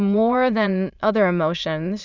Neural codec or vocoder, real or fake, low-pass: autoencoder, 22.05 kHz, a latent of 192 numbers a frame, VITS, trained on many speakers; fake; 7.2 kHz